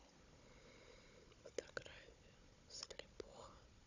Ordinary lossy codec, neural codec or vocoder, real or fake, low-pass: none; codec, 16 kHz, 16 kbps, FunCodec, trained on Chinese and English, 50 frames a second; fake; 7.2 kHz